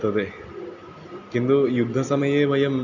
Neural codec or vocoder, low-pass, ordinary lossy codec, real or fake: none; 7.2 kHz; none; real